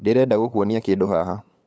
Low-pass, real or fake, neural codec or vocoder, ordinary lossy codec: none; fake; codec, 16 kHz, 8 kbps, FunCodec, trained on LibriTTS, 25 frames a second; none